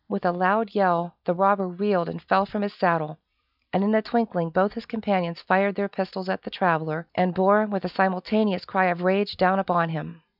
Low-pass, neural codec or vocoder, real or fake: 5.4 kHz; none; real